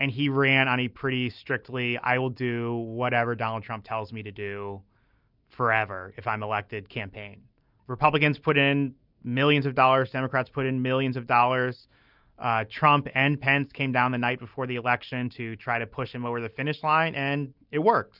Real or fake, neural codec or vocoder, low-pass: real; none; 5.4 kHz